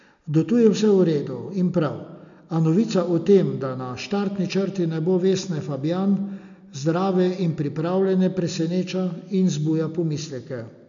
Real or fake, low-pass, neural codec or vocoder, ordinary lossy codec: real; 7.2 kHz; none; none